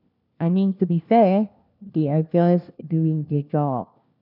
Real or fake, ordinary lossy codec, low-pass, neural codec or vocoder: fake; none; 5.4 kHz; codec, 16 kHz, 1 kbps, FunCodec, trained on LibriTTS, 50 frames a second